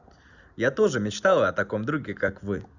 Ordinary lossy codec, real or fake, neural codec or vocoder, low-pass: none; real; none; 7.2 kHz